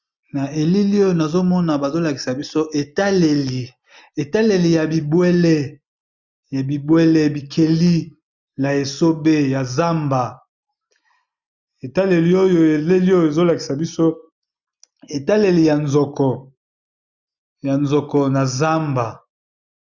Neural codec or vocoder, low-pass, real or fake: none; 7.2 kHz; real